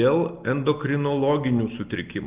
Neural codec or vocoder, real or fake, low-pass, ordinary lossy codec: none; real; 3.6 kHz; Opus, 32 kbps